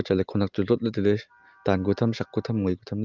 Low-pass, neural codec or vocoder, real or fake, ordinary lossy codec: 7.2 kHz; none; real; Opus, 32 kbps